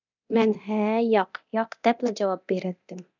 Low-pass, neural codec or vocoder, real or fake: 7.2 kHz; codec, 24 kHz, 0.9 kbps, DualCodec; fake